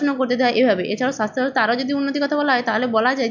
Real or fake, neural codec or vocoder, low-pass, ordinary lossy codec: real; none; 7.2 kHz; none